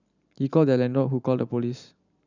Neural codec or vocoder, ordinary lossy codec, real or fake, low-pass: vocoder, 44.1 kHz, 128 mel bands every 512 samples, BigVGAN v2; none; fake; 7.2 kHz